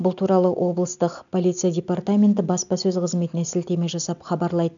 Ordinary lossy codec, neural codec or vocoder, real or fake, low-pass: none; none; real; 7.2 kHz